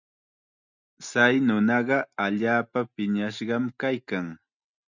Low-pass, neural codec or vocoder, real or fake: 7.2 kHz; none; real